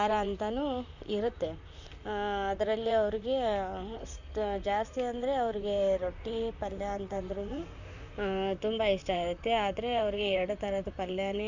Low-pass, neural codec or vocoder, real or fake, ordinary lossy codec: 7.2 kHz; vocoder, 44.1 kHz, 128 mel bands, Pupu-Vocoder; fake; none